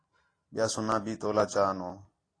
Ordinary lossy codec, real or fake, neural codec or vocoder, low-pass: AAC, 32 kbps; real; none; 9.9 kHz